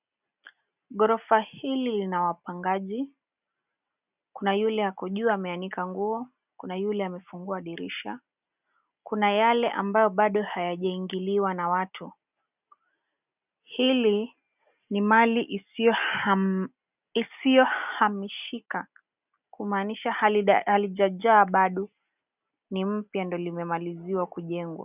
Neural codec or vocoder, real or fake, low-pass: none; real; 3.6 kHz